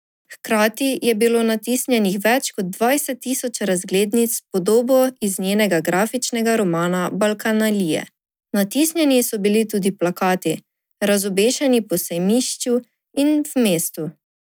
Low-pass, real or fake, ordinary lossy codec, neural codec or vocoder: none; real; none; none